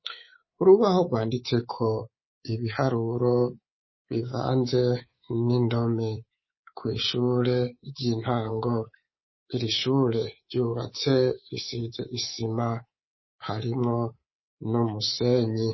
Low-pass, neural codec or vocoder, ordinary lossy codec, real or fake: 7.2 kHz; codec, 24 kHz, 3.1 kbps, DualCodec; MP3, 24 kbps; fake